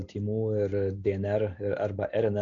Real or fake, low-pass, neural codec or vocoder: real; 7.2 kHz; none